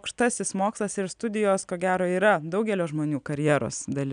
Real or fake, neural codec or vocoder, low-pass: real; none; 9.9 kHz